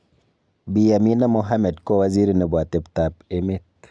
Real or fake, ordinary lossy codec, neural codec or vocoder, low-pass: real; none; none; 9.9 kHz